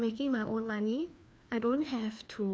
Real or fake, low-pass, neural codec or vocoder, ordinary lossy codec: fake; none; codec, 16 kHz, 2 kbps, FreqCodec, larger model; none